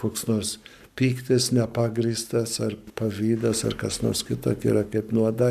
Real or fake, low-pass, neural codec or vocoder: fake; 14.4 kHz; codec, 44.1 kHz, 7.8 kbps, Pupu-Codec